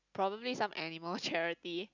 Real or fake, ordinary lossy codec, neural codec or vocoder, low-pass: real; none; none; 7.2 kHz